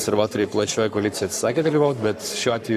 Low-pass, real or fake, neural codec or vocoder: 14.4 kHz; fake; codec, 44.1 kHz, 7.8 kbps, Pupu-Codec